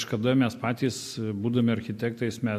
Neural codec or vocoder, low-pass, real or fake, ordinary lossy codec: none; 14.4 kHz; real; MP3, 64 kbps